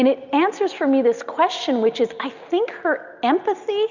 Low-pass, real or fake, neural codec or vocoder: 7.2 kHz; real; none